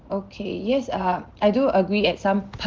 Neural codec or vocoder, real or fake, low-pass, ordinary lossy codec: none; real; 7.2 kHz; Opus, 16 kbps